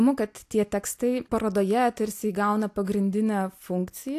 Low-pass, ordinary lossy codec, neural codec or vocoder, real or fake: 14.4 kHz; AAC, 64 kbps; none; real